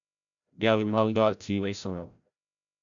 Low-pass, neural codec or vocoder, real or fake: 7.2 kHz; codec, 16 kHz, 0.5 kbps, FreqCodec, larger model; fake